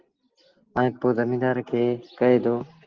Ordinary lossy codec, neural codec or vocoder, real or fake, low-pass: Opus, 16 kbps; none; real; 7.2 kHz